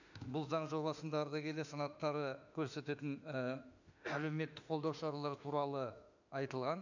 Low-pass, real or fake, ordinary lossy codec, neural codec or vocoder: 7.2 kHz; fake; none; autoencoder, 48 kHz, 32 numbers a frame, DAC-VAE, trained on Japanese speech